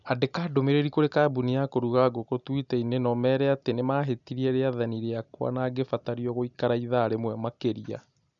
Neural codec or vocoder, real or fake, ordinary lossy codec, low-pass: none; real; none; 7.2 kHz